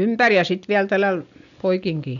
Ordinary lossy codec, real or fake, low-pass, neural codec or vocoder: none; real; 7.2 kHz; none